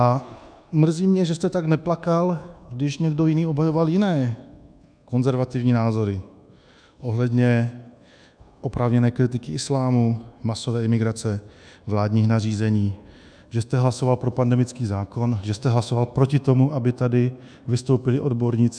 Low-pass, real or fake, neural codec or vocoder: 9.9 kHz; fake; codec, 24 kHz, 1.2 kbps, DualCodec